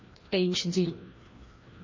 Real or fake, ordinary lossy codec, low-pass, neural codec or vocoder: fake; MP3, 32 kbps; 7.2 kHz; codec, 16 kHz, 1 kbps, FreqCodec, larger model